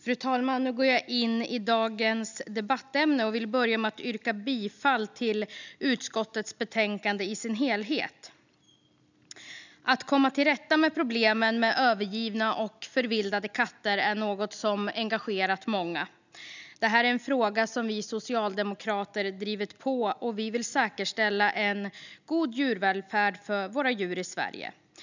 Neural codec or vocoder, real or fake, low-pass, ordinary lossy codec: none; real; 7.2 kHz; none